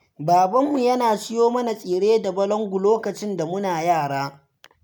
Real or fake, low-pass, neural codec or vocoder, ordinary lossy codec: real; none; none; none